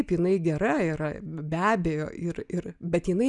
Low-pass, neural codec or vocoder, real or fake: 10.8 kHz; none; real